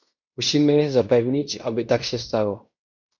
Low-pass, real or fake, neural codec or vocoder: 7.2 kHz; fake; codec, 16 kHz in and 24 kHz out, 0.9 kbps, LongCat-Audio-Codec, fine tuned four codebook decoder